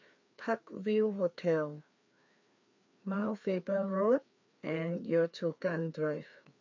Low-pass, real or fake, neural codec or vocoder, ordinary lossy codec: 7.2 kHz; fake; codec, 16 kHz, 2 kbps, FreqCodec, larger model; MP3, 48 kbps